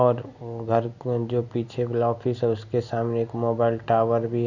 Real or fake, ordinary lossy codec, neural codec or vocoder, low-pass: real; none; none; 7.2 kHz